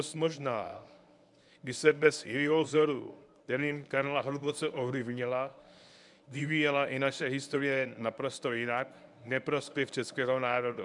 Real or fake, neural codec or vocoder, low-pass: fake; codec, 24 kHz, 0.9 kbps, WavTokenizer, medium speech release version 1; 10.8 kHz